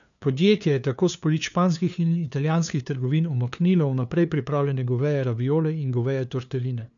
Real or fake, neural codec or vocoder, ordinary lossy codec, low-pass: fake; codec, 16 kHz, 2 kbps, FunCodec, trained on LibriTTS, 25 frames a second; none; 7.2 kHz